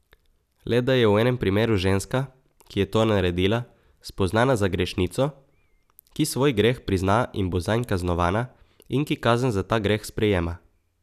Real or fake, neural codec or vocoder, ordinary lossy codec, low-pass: real; none; none; 14.4 kHz